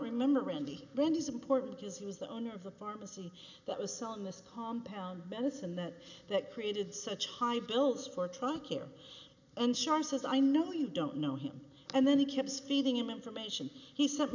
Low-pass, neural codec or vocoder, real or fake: 7.2 kHz; none; real